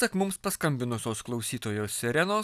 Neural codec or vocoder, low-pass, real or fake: none; 14.4 kHz; real